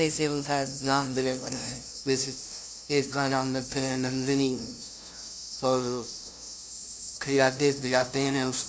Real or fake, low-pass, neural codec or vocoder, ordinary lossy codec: fake; none; codec, 16 kHz, 0.5 kbps, FunCodec, trained on LibriTTS, 25 frames a second; none